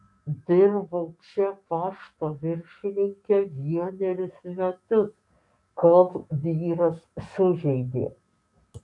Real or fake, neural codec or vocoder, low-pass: fake; codec, 44.1 kHz, 7.8 kbps, DAC; 10.8 kHz